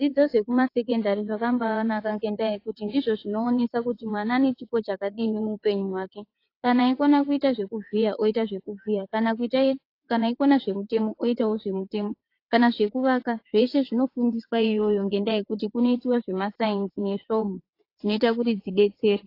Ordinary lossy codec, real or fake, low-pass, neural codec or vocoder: AAC, 32 kbps; fake; 5.4 kHz; vocoder, 22.05 kHz, 80 mel bands, WaveNeXt